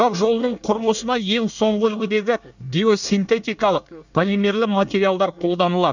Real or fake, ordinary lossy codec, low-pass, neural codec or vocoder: fake; none; 7.2 kHz; codec, 24 kHz, 1 kbps, SNAC